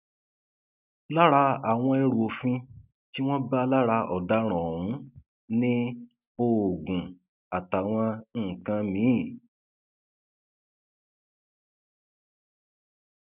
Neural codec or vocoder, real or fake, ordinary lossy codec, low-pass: none; real; none; 3.6 kHz